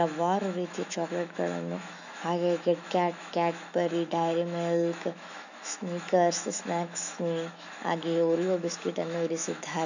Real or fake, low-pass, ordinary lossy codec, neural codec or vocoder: fake; 7.2 kHz; none; autoencoder, 48 kHz, 128 numbers a frame, DAC-VAE, trained on Japanese speech